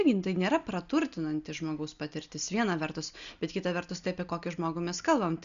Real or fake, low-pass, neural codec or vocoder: real; 7.2 kHz; none